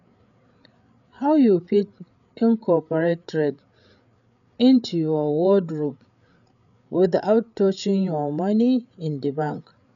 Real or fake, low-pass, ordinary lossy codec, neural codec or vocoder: fake; 7.2 kHz; none; codec, 16 kHz, 8 kbps, FreqCodec, larger model